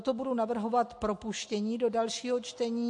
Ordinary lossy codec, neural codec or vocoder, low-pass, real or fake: MP3, 48 kbps; none; 10.8 kHz; real